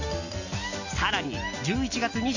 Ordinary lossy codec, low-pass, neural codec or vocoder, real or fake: none; 7.2 kHz; none; real